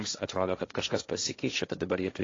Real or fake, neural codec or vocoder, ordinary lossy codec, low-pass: fake; codec, 16 kHz, 1.1 kbps, Voila-Tokenizer; AAC, 32 kbps; 7.2 kHz